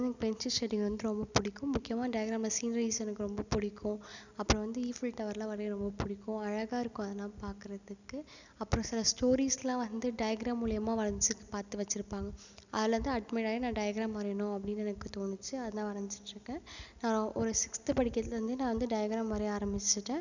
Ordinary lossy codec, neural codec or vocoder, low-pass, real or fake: none; none; 7.2 kHz; real